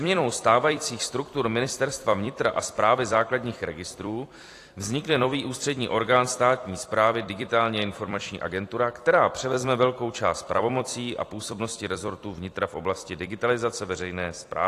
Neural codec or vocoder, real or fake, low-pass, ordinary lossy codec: vocoder, 44.1 kHz, 128 mel bands every 256 samples, BigVGAN v2; fake; 14.4 kHz; AAC, 48 kbps